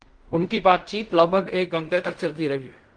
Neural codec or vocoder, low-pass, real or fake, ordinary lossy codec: codec, 16 kHz in and 24 kHz out, 0.4 kbps, LongCat-Audio-Codec, fine tuned four codebook decoder; 9.9 kHz; fake; Opus, 32 kbps